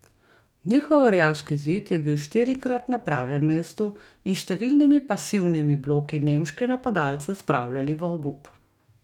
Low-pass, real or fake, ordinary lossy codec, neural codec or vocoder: 19.8 kHz; fake; none; codec, 44.1 kHz, 2.6 kbps, DAC